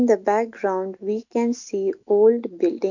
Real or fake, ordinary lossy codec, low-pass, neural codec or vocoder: real; none; 7.2 kHz; none